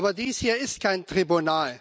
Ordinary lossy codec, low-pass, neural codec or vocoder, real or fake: none; none; none; real